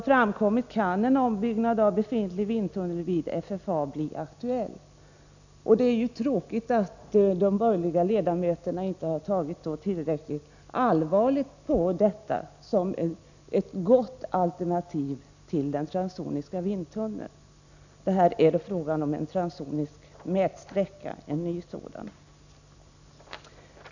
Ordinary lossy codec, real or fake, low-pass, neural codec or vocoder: none; real; 7.2 kHz; none